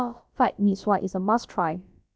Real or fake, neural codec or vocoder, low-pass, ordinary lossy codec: fake; codec, 16 kHz, about 1 kbps, DyCAST, with the encoder's durations; none; none